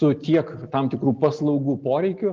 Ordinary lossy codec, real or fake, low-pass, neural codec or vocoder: Opus, 32 kbps; real; 7.2 kHz; none